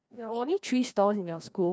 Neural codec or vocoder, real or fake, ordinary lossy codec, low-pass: codec, 16 kHz, 1 kbps, FreqCodec, larger model; fake; none; none